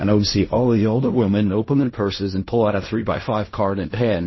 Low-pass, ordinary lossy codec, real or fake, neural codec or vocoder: 7.2 kHz; MP3, 24 kbps; fake; codec, 16 kHz in and 24 kHz out, 0.4 kbps, LongCat-Audio-Codec, fine tuned four codebook decoder